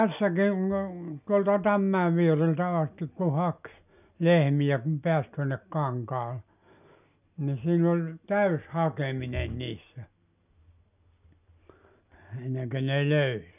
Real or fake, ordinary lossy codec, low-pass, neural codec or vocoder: real; none; 3.6 kHz; none